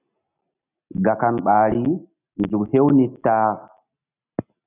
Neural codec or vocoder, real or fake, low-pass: none; real; 3.6 kHz